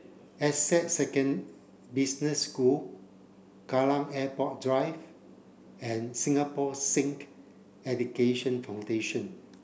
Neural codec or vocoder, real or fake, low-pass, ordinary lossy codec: none; real; none; none